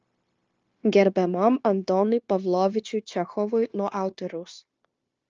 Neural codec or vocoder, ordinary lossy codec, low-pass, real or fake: codec, 16 kHz, 0.9 kbps, LongCat-Audio-Codec; Opus, 24 kbps; 7.2 kHz; fake